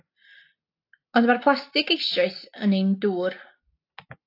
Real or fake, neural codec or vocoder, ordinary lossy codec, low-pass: real; none; AAC, 32 kbps; 5.4 kHz